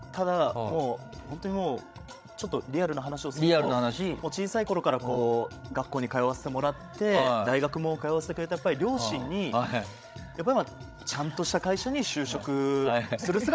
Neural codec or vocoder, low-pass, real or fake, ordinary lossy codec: codec, 16 kHz, 16 kbps, FreqCodec, larger model; none; fake; none